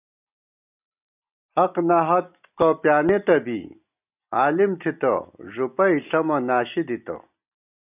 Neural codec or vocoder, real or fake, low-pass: none; real; 3.6 kHz